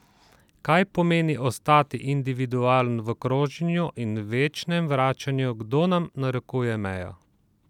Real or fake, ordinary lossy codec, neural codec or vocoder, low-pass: real; none; none; 19.8 kHz